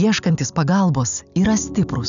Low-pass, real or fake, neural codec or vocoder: 7.2 kHz; real; none